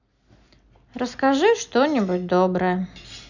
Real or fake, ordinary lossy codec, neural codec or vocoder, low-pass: real; none; none; 7.2 kHz